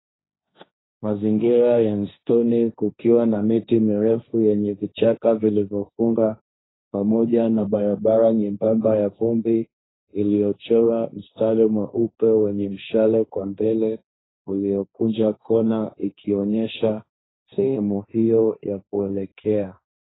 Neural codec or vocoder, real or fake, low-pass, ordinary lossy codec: codec, 16 kHz, 1.1 kbps, Voila-Tokenizer; fake; 7.2 kHz; AAC, 16 kbps